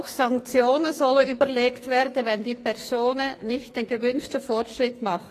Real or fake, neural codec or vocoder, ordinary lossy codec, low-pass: fake; codec, 44.1 kHz, 2.6 kbps, SNAC; AAC, 48 kbps; 14.4 kHz